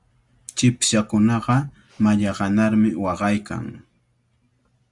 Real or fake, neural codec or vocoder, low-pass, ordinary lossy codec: real; none; 10.8 kHz; Opus, 64 kbps